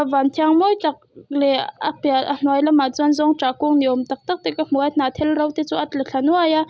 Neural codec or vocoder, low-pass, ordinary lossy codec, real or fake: none; none; none; real